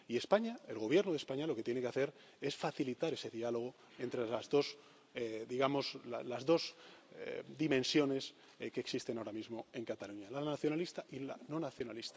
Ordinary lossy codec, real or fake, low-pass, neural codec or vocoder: none; real; none; none